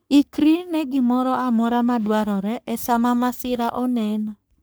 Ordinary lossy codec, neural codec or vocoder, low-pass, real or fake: none; codec, 44.1 kHz, 3.4 kbps, Pupu-Codec; none; fake